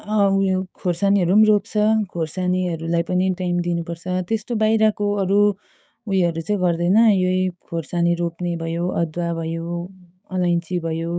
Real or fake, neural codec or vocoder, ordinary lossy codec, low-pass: fake; codec, 16 kHz, 6 kbps, DAC; none; none